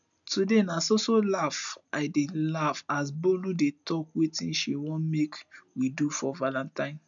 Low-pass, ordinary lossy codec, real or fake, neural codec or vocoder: 7.2 kHz; none; real; none